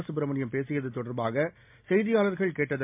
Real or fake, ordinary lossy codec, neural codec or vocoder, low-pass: real; MP3, 32 kbps; none; 3.6 kHz